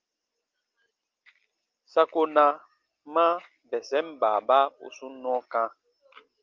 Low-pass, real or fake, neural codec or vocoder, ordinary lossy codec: 7.2 kHz; real; none; Opus, 24 kbps